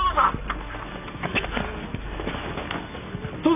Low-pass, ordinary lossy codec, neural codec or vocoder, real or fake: 3.6 kHz; none; none; real